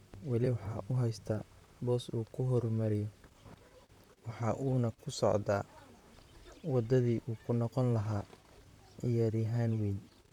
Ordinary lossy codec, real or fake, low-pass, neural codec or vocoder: none; fake; 19.8 kHz; vocoder, 44.1 kHz, 128 mel bands, Pupu-Vocoder